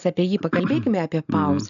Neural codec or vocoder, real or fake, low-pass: none; real; 7.2 kHz